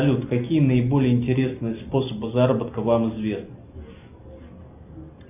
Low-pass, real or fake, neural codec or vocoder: 3.6 kHz; real; none